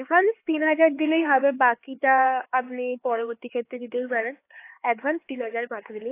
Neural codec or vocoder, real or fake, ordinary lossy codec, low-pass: codec, 16 kHz, 4 kbps, X-Codec, HuBERT features, trained on LibriSpeech; fake; AAC, 24 kbps; 3.6 kHz